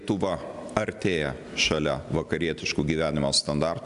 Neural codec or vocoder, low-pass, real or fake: none; 10.8 kHz; real